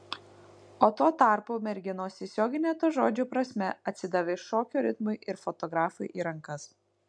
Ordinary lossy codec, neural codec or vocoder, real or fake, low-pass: MP3, 64 kbps; none; real; 9.9 kHz